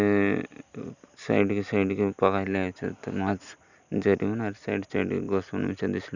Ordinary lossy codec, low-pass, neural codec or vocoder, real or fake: none; 7.2 kHz; none; real